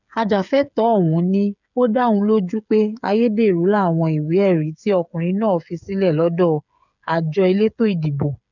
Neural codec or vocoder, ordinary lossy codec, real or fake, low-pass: codec, 16 kHz, 8 kbps, FreqCodec, smaller model; none; fake; 7.2 kHz